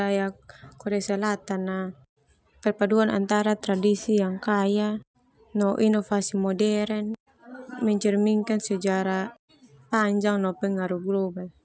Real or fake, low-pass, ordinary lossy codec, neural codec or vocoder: real; none; none; none